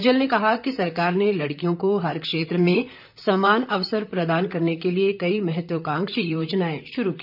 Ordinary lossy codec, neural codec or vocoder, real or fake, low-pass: none; vocoder, 44.1 kHz, 128 mel bands, Pupu-Vocoder; fake; 5.4 kHz